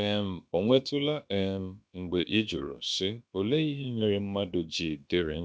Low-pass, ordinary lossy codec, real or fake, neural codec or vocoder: none; none; fake; codec, 16 kHz, about 1 kbps, DyCAST, with the encoder's durations